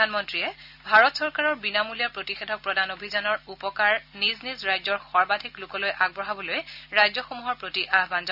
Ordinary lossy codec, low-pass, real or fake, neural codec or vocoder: none; 5.4 kHz; real; none